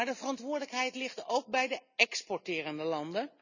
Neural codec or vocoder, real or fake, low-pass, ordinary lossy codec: none; real; 7.2 kHz; none